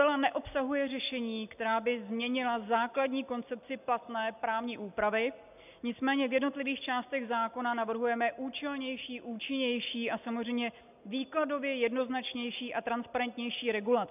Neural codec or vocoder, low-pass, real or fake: none; 3.6 kHz; real